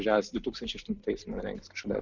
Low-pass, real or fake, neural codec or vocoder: 7.2 kHz; real; none